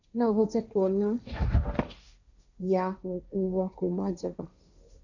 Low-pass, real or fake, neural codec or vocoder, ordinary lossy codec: 7.2 kHz; fake; codec, 16 kHz, 1.1 kbps, Voila-Tokenizer; none